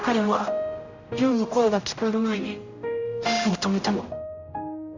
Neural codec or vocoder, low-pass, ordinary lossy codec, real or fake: codec, 16 kHz, 0.5 kbps, X-Codec, HuBERT features, trained on general audio; 7.2 kHz; Opus, 64 kbps; fake